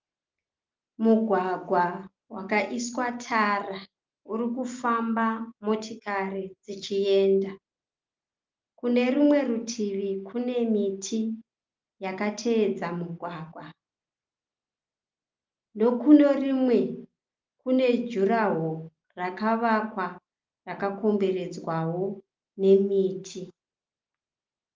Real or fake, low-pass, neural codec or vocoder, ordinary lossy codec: real; 7.2 kHz; none; Opus, 32 kbps